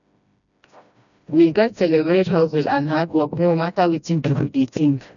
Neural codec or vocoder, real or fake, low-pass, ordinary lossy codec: codec, 16 kHz, 1 kbps, FreqCodec, smaller model; fake; 7.2 kHz; none